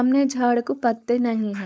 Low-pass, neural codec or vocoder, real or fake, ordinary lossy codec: none; codec, 16 kHz, 4.8 kbps, FACodec; fake; none